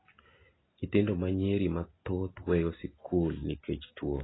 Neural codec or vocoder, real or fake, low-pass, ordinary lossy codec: none; real; 7.2 kHz; AAC, 16 kbps